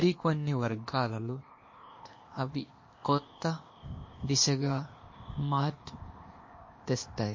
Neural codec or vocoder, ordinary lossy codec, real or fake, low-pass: codec, 16 kHz, 0.8 kbps, ZipCodec; MP3, 32 kbps; fake; 7.2 kHz